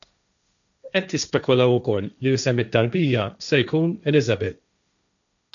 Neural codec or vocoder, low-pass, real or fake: codec, 16 kHz, 1.1 kbps, Voila-Tokenizer; 7.2 kHz; fake